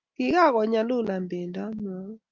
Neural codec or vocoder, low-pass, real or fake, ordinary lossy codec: none; 7.2 kHz; real; Opus, 24 kbps